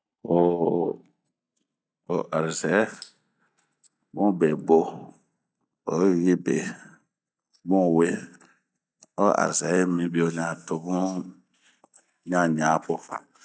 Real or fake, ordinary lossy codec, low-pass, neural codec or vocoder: real; none; none; none